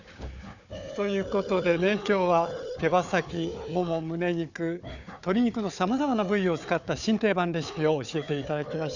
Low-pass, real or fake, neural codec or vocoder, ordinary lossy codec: 7.2 kHz; fake; codec, 16 kHz, 4 kbps, FunCodec, trained on Chinese and English, 50 frames a second; none